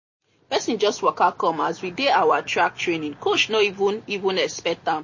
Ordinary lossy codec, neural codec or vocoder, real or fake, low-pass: MP3, 32 kbps; none; real; 7.2 kHz